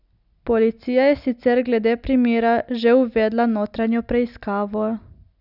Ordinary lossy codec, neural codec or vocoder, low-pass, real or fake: none; none; 5.4 kHz; real